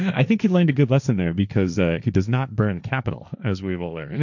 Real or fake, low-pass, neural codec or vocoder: fake; 7.2 kHz; codec, 16 kHz, 1.1 kbps, Voila-Tokenizer